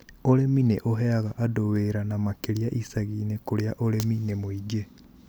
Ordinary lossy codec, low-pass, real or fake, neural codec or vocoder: none; none; real; none